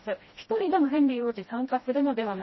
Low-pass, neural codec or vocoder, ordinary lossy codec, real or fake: 7.2 kHz; codec, 16 kHz, 1 kbps, FreqCodec, smaller model; MP3, 24 kbps; fake